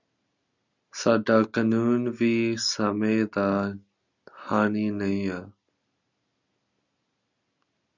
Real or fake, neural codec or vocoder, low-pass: real; none; 7.2 kHz